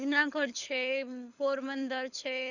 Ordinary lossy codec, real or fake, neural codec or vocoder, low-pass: none; fake; codec, 24 kHz, 6 kbps, HILCodec; 7.2 kHz